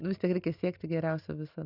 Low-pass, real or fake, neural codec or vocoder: 5.4 kHz; real; none